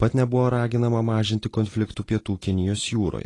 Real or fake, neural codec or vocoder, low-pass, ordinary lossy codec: real; none; 10.8 kHz; AAC, 32 kbps